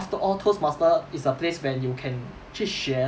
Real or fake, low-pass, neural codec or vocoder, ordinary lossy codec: real; none; none; none